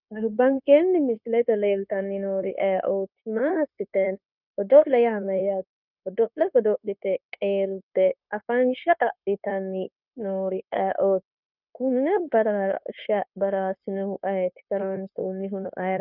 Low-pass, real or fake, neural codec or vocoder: 5.4 kHz; fake; codec, 16 kHz, 0.9 kbps, LongCat-Audio-Codec